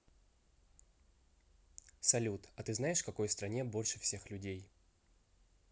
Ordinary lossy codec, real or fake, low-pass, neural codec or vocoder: none; real; none; none